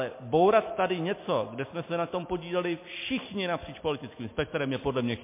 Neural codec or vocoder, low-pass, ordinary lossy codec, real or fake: none; 3.6 kHz; MP3, 24 kbps; real